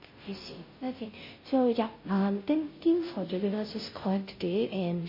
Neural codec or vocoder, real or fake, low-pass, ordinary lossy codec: codec, 16 kHz, 0.5 kbps, FunCodec, trained on Chinese and English, 25 frames a second; fake; 5.4 kHz; MP3, 24 kbps